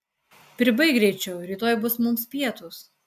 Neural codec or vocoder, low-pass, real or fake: none; 14.4 kHz; real